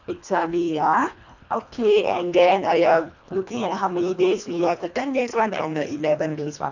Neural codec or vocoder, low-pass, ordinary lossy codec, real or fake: codec, 24 kHz, 1.5 kbps, HILCodec; 7.2 kHz; none; fake